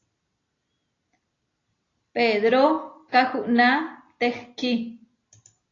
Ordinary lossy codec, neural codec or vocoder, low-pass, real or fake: AAC, 32 kbps; none; 7.2 kHz; real